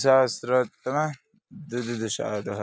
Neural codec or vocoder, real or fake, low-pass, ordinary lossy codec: none; real; none; none